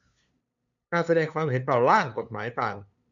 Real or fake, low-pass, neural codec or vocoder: fake; 7.2 kHz; codec, 16 kHz, 2 kbps, FunCodec, trained on LibriTTS, 25 frames a second